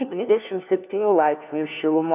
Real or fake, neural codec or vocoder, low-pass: fake; codec, 16 kHz, 1 kbps, FunCodec, trained on LibriTTS, 50 frames a second; 3.6 kHz